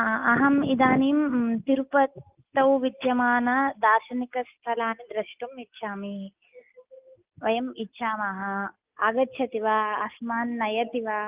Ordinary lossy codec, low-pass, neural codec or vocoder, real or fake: Opus, 24 kbps; 3.6 kHz; none; real